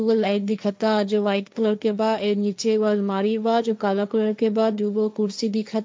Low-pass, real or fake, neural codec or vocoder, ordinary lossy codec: none; fake; codec, 16 kHz, 1.1 kbps, Voila-Tokenizer; none